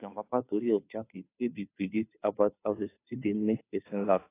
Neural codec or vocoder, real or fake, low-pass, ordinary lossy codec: codec, 16 kHz, 2 kbps, FunCodec, trained on Chinese and English, 25 frames a second; fake; 3.6 kHz; AAC, 24 kbps